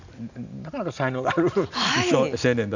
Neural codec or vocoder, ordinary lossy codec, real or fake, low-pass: none; none; real; 7.2 kHz